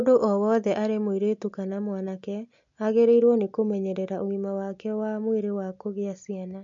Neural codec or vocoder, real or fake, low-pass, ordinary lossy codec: none; real; 7.2 kHz; MP3, 48 kbps